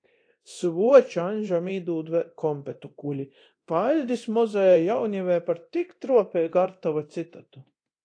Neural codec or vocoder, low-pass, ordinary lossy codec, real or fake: codec, 24 kHz, 0.9 kbps, DualCodec; 9.9 kHz; AAC, 48 kbps; fake